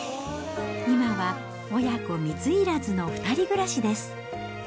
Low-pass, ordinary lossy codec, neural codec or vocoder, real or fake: none; none; none; real